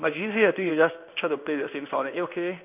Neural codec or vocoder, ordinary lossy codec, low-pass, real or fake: codec, 16 kHz in and 24 kHz out, 1 kbps, XY-Tokenizer; none; 3.6 kHz; fake